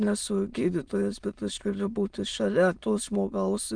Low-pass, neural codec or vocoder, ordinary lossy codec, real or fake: 9.9 kHz; autoencoder, 22.05 kHz, a latent of 192 numbers a frame, VITS, trained on many speakers; Opus, 24 kbps; fake